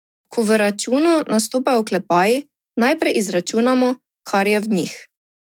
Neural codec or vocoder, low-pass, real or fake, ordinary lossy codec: codec, 44.1 kHz, 7.8 kbps, DAC; 19.8 kHz; fake; none